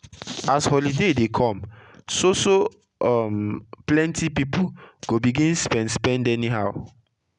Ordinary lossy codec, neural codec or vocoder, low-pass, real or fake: none; none; 10.8 kHz; real